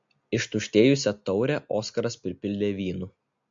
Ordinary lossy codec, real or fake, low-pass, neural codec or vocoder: MP3, 48 kbps; real; 7.2 kHz; none